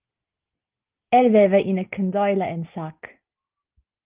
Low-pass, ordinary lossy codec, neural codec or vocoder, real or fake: 3.6 kHz; Opus, 32 kbps; none; real